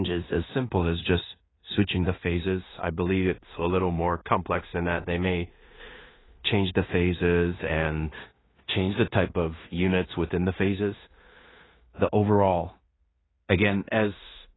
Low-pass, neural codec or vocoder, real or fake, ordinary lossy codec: 7.2 kHz; codec, 16 kHz in and 24 kHz out, 0.4 kbps, LongCat-Audio-Codec, two codebook decoder; fake; AAC, 16 kbps